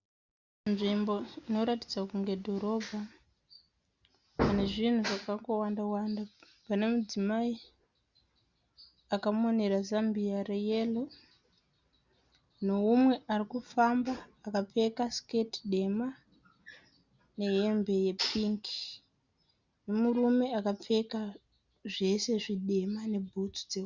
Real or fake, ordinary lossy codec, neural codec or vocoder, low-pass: real; Opus, 64 kbps; none; 7.2 kHz